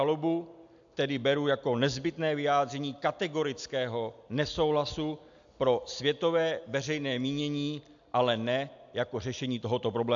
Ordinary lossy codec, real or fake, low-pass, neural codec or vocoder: AAC, 64 kbps; real; 7.2 kHz; none